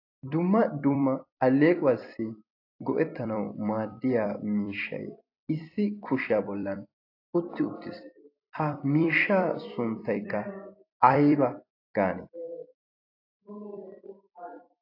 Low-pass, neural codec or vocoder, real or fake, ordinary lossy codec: 5.4 kHz; vocoder, 44.1 kHz, 128 mel bands every 256 samples, BigVGAN v2; fake; AAC, 32 kbps